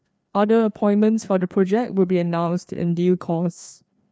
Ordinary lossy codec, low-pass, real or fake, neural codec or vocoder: none; none; fake; codec, 16 kHz, 2 kbps, FreqCodec, larger model